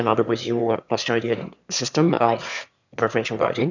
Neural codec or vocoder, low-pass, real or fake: autoencoder, 22.05 kHz, a latent of 192 numbers a frame, VITS, trained on one speaker; 7.2 kHz; fake